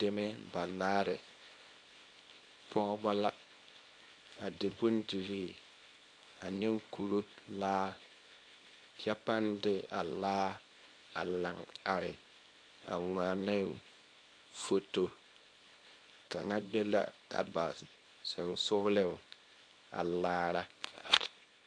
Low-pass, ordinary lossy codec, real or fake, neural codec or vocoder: 9.9 kHz; MP3, 48 kbps; fake; codec, 24 kHz, 0.9 kbps, WavTokenizer, small release